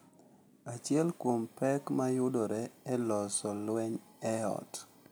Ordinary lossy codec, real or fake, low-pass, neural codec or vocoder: none; real; none; none